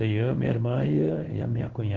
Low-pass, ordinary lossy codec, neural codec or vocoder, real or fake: 7.2 kHz; Opus, 16 kbps; codec, 16 kHz in and 24 kHz out, 1 kbps, XY-Tokenizer; fake